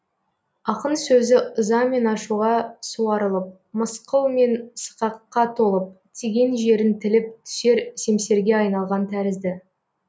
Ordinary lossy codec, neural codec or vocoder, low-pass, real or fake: none; none; none; real